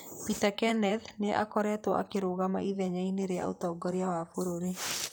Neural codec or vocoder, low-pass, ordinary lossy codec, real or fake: vocoder, 44.1 kHz, 128 mel bands, Pupu-Vocoder; none; none; fake